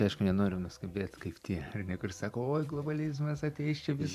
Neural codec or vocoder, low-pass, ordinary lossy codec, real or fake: vocoder, 44.1 kHz, 128 mel bands every 512 samples, BigVGAN v2; 14.4 kHz; MP3, 96 kbps; fake